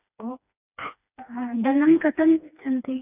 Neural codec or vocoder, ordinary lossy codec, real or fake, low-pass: codec, 16 kHz, 2 kbps, FreqCodec, smaller model; none; fake; 3.6 kHz